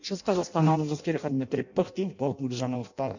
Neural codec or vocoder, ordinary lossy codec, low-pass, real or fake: codec, 16 kHz in and 24 kHz out, 0.6 kbps, FireRedTTS-2 codec; none; 7.2 kHz; fake